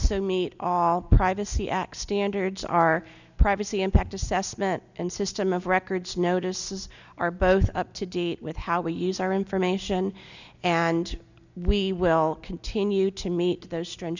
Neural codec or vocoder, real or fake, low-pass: none; real; 7.2 kHz